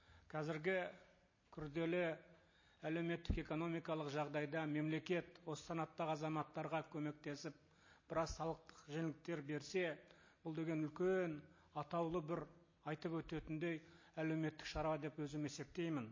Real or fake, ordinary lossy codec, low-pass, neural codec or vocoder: real; MP3, 32 kbps; 7.2 kHz; none